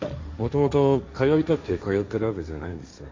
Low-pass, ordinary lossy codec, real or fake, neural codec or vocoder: 7.2 kHz; MP3, 64 kbps; fake; codec, 16 kHz, 1.1 kbps, Voila-Tokenizer